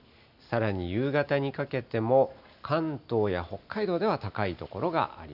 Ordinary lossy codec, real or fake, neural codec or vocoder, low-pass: none; real; none; 5.4 kHz